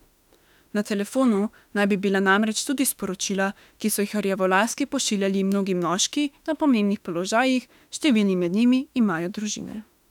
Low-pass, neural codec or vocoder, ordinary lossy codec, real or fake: 19.8 kHz; autoencoder, 48 kHz, 32 numbers a frame, DAC-VAE, trained on Japanese speech; none; fake